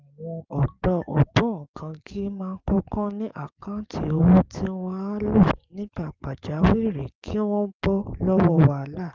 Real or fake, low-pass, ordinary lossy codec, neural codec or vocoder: fake; 7.2 kHz; Opus, 24 kbps; codec, 44.1 kHz, 7.8 kbps, DAC